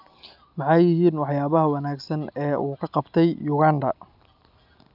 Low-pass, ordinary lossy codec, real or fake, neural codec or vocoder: 5.4 kHz; none; real; none